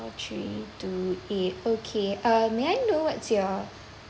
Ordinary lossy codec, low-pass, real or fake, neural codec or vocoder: none; none; real; none